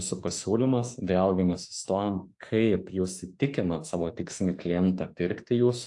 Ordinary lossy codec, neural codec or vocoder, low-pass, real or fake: AAC, 64 kbps; autoencoder, 48 kHz, 32 numbers a frame, DAC-VAE, trained on Japanese speech; 10.8 kHz; fake